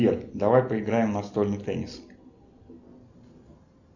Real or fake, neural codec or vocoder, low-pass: real; none; 7.2 kHz